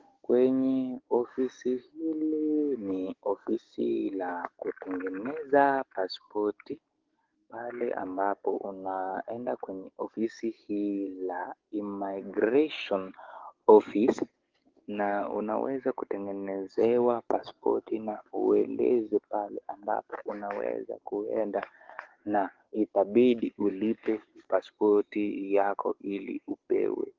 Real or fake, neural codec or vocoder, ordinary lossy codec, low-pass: fake; codec, 44.1 kHz, 7.8 kbps, DAC; Opus, 16 kbps; 7.2 kHz